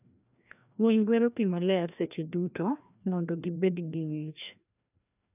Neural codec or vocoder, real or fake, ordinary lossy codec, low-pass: codec, 16 kHz, 1 kbps, FreqCodec, larger model; fake; none; 3.6 kHz